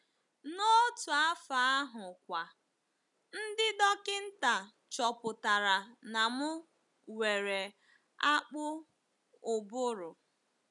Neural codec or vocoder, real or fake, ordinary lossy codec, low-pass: none; real; none; 10.8 kHz